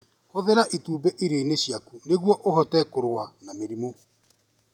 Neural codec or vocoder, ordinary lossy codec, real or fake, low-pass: vocoder, 48 kHz, 128 mel bands, Vocos; none; fake; 19.8 kHz